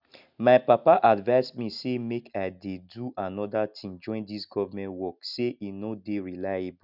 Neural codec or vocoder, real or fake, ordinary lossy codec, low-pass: none; real; none; 5.4 kHz